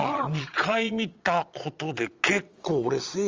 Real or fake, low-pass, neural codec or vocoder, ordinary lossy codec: fake; 7.2 kHz; vocoder, 22.05 kHz, 80 mel bands, Vocos; Opus, 32 kbps